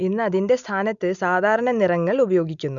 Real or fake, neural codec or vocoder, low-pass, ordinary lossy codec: real; none; 7.2 kHz; none